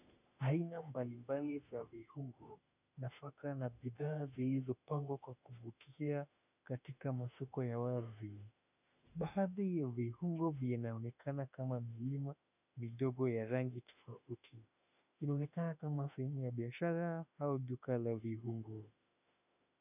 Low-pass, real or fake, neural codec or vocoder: 3.6 kHz; fake; autoencoder, 48 kHz, 32 numbers a frame, DAC-VAE, trained on Japanese speech